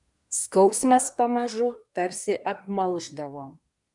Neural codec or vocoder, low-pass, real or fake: codec, 24 kHz, 1 kbps, SNAC; 10.8 kHz; fake